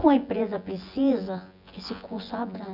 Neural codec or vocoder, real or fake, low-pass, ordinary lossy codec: vocoder, 24 kHz, 100 mel bands, Vocos; fake; 5.4 kHz; none